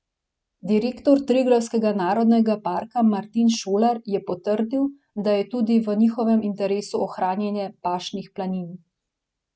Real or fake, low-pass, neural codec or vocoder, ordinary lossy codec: real; none; none; none